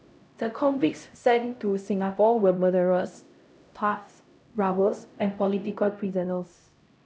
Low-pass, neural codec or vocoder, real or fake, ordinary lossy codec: none; codec, 16 kHz, 0.5 kbps, X-Codec, HuBERT features, trained on LibriSpeech; fake; none